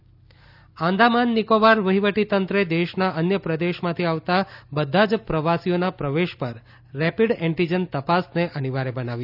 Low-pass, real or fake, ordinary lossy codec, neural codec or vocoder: 5.4 kHz; real; none; none